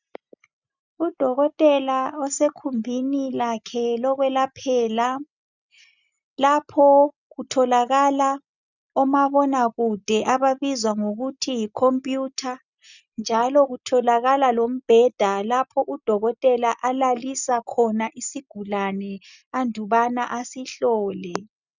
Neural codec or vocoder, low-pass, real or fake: none; 7.2 kHz; real